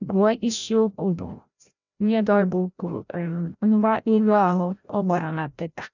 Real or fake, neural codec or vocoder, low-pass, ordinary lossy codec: fake; codec, 16 kHz, 0.5 kbps, FreqCodec, larger model; 7.2 kHz; none